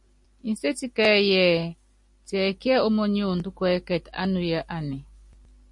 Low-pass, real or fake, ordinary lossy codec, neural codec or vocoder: 10.8 kHz; real; MP3, 48 kbps; none